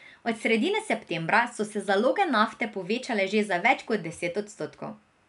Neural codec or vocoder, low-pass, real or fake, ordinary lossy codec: none; 10.8 kHz; real; none